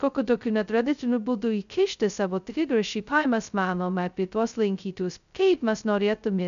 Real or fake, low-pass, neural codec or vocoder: fake; 7.2 kHz; codec, 16 kHz, 0.2 kbps, FocalCodec